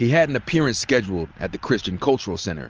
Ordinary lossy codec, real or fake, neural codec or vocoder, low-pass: Opus, 24 kbps; real; none; 7.2 kHz